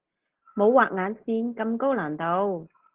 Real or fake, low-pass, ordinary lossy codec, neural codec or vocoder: real; 3.6 kHz; Opus, 16 kbps; none